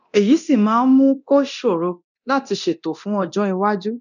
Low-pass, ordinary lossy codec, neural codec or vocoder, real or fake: 7.2 kHz; none; codec, 24 kHz, 0.9 kbps, DualCodec; fake